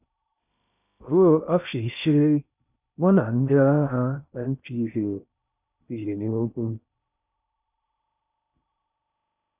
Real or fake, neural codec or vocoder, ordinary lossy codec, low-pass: fake; codec, 16 kHz in and 24 kHz out, 0.6 kbps, FocalCodec, streaming, 2048 codes; none; 3.6 kHz